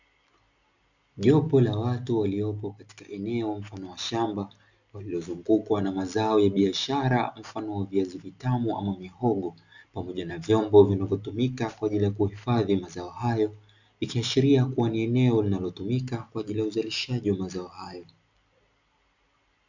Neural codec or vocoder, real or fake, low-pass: none; real; 7.2 kHz